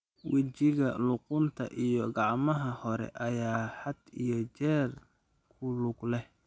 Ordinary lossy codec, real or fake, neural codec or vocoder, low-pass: none; real; none; none